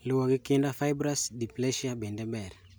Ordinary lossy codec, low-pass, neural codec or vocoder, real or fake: none; none; none; real